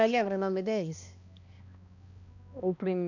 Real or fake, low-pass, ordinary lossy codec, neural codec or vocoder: fake; 7.2 kHz; none; codec, 16 kHz, 1 kbps, X-Codec, HuBERT features, trained on balanced general audio